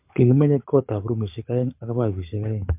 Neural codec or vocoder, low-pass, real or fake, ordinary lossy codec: codec, 24 kHz, 6 kbps, HILCodec; 3.6 kHz; fake; MP3, 32 kbps